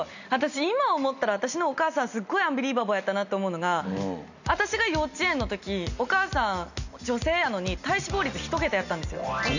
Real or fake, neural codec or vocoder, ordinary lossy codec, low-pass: real; none; none; 7.2 kHz